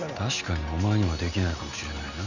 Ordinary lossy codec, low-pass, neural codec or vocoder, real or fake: none; 7.2 kHz; none; real